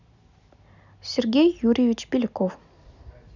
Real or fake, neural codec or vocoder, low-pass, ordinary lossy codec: real; none; 7.2 kHz; none